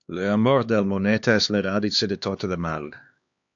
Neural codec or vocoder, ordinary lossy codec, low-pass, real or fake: codec, 16 kHz, 2 kbps, X-Codec, HuBERT features, trained on LibriSpeech; AAC, 64 kbps; 7.2 kHz; fake